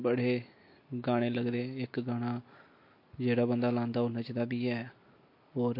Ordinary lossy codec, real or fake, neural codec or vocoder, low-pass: MP3, 32 kbps; real; none; 5.4 kHz